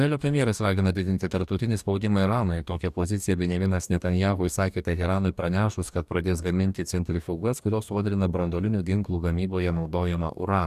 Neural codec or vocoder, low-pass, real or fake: codec, 44.1 kHz, 2.6 kbps, DAC; 14.4 kHz; fake